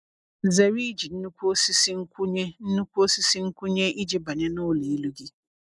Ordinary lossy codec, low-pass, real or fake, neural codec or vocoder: none; 10.8 kHz; real; none